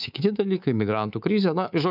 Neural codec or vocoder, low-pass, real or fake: codec, 24 kHz, 3.1 kbps, DualCodec; 5.4 kHz; fake